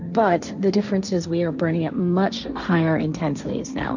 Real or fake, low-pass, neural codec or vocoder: fake; 7.2 kHz; codec, 16 kHz, 1.1 kbps, Voila-Tokenizer